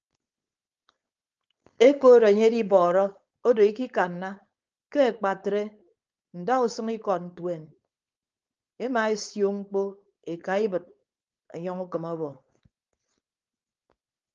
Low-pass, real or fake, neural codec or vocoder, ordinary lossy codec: 7.2 kHz; fake; codec, 16 kHz, 4.8 kbps, FACodec; Opus, 24 kbps